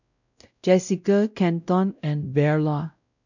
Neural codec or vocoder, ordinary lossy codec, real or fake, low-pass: codec, 16 kHz, 0.5 kbps, X-Codec, WavLM features, trained on Multilingual LibriSpeech; none; fake; 7.2 kHz